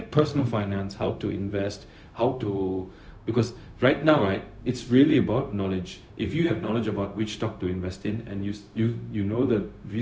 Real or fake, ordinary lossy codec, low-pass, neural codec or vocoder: fake; none; none; codec, 16 kHz, 0.4 kbps, LongCat-Audio-Codec